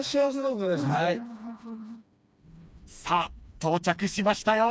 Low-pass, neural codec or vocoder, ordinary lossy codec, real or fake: none; codec, 16 kHz, 2 kbps, FreqCodec, smaller model; none; fake